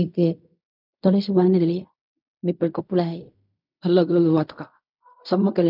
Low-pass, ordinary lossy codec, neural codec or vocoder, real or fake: 5.4 kHz; none; codec, 16 kHz in and 24 kHz out, 0.4 kbps, LongCat-Audio-Codec, fine tuned four codebook decoder; fake